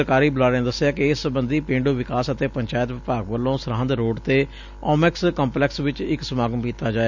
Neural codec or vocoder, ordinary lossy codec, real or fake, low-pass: none; none; real; 7.2 kHz